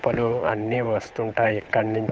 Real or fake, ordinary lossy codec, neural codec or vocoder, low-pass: fake; none; codec, 16 kHz, 8 kbps, FunCodec, trained on Chinese and English, 25 frames a second; none